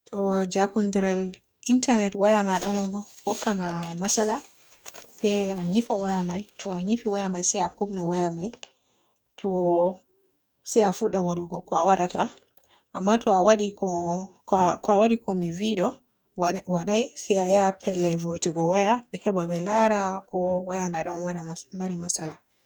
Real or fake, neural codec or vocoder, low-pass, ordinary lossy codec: fake; codec, 44.1 kHz, 2.6 kbps, DAC; none; none